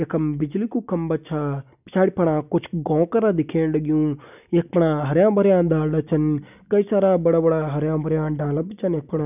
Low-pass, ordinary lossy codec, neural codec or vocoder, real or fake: 3.6 kHz; none; none; real